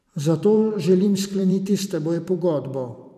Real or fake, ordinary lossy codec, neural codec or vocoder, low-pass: fake; none; vocoder, 44.1 kHz, 128 mel bands every 512 samples, BigVGAN v2; 14.4 kHz